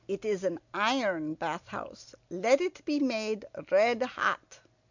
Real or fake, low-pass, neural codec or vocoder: real; 7.2 kHz; none